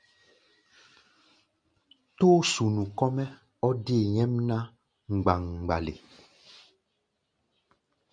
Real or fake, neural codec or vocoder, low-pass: real; none; 9.9 kHz